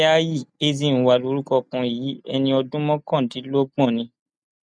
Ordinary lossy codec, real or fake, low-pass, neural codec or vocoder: none; real; 9.9 kHz; none